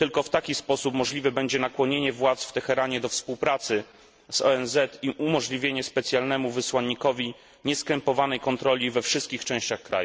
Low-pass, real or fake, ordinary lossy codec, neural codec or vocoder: none; real; none; none